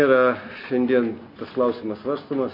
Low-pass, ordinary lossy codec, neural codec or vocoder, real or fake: 5.4 kHz; MP3, 32 kbps; none; real